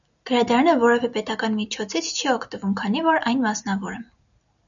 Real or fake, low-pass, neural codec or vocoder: real; 7.2 kHz; none